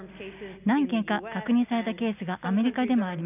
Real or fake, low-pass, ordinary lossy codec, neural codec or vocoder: real; 3.6 kHz; none; none